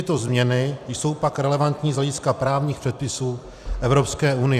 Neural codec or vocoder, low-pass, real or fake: vocoder, 44.1 kHz, 128 mel bands every 256 samples, BigVGAN v2; 14.4 kHz; fake